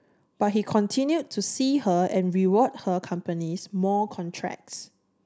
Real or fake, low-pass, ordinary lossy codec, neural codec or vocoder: real; none; none; none